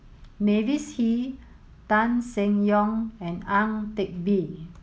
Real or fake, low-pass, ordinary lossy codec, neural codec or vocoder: real; none; none; none